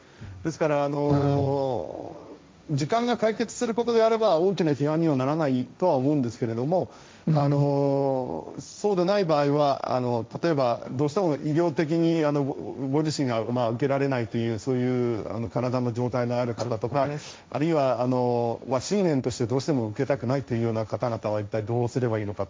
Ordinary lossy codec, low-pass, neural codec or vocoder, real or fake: none; none; codec, 16 kHz, 1.1 kbps, Voila-Tokenizer; fake